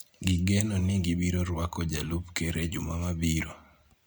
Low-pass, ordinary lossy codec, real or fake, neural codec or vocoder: none; none; real; none